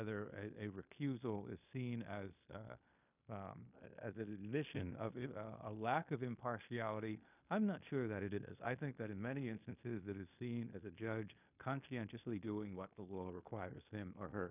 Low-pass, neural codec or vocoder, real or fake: 3.6 kHz; codec, 16 kHz in and 24 kHz out, 0.9 kbps, LongCat-Audio-Codec, fine tuned four codebook decoder; fake